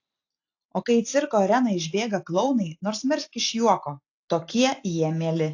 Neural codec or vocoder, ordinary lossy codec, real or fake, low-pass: none; AAC, 48 kbps; real; 7.2 kHz